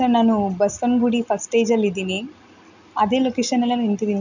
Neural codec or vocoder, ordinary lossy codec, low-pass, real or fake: none; none; 7.2 kHz; real